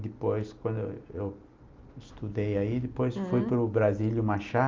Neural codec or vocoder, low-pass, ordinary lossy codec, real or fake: none; 7.2 kHz; Opus, 24 kbps; real